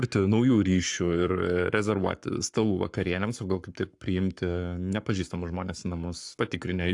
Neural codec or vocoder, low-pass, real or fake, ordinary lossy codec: codec, 44.1 kHz, 7.8 kbps, Pupu-Codec; 10.8 kHz; fake; AAC, 64 kbps